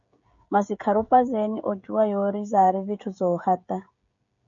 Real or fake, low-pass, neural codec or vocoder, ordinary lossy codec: fake; 7.2 kHz; codec, 16 kHz, 16 kbps, FreqCodec, smaller model; MP3, 48 kbps